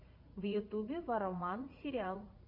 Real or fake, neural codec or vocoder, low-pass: fake; vocoder, 44.1 kHz, 80 mel bands, Vocos; 5.4 kHz